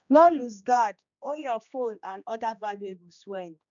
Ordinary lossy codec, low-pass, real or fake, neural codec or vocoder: none; 7.2 kHz; fake; codec, 16 kHz, 1 kbps, X-Codec, HuBERT features, trained on general audio